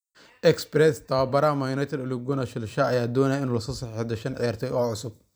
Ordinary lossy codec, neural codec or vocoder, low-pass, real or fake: none; vocoder, 44.1 kHz, 128 mel bands every 256 samples, BigVGAN v2; none; fake